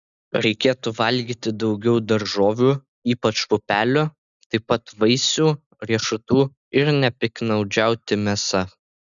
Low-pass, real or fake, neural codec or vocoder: 7.2 kHz; real; none